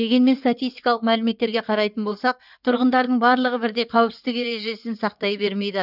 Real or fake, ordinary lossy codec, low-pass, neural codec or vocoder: fake; none; 5.4 kHz; codec, 16 kHz in and 24 kHz out, 2.2 kbps, FireRedTTS-2 codec